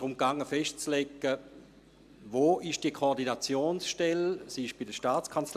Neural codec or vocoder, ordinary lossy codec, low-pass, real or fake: none; AAC, 96 kbps; 14.4 kHz; real